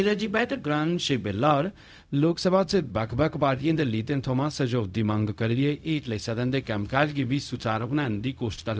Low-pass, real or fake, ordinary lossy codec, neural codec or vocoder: none; fake; none; codec, 16 kHz, 0.4 kbps, LongCat-Audio-Codec